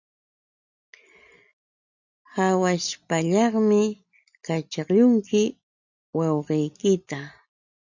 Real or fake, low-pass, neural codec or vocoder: real; 7.2 kHz; none